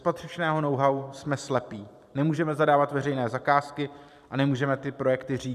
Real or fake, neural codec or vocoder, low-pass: fake; vocoder, 44.1 kHz, 128 mel bands every 512 samples, BigVGAN v2; 14.4 kHz